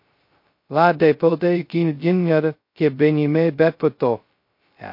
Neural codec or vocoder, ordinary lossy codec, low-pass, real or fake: codec, 16 kHz, 0.2 kbps, FocalCodec; MP3, 32 kbps; 5.4 kHz; fake